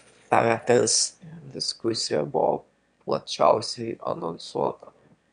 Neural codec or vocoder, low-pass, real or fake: autoencoder, 22.05 kHz, a latent of 192 numbers a frame, VITS, trained on one speaker; 9.9 kHz; fake